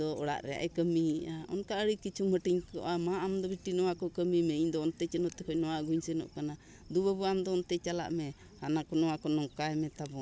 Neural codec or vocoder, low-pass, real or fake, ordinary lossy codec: none; none; real; none